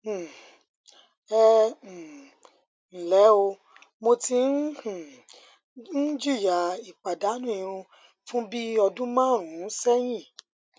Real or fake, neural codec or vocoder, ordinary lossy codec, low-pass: real; none; none; none